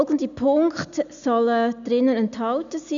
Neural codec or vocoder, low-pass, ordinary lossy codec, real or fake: none; 7.2 kHz; none; real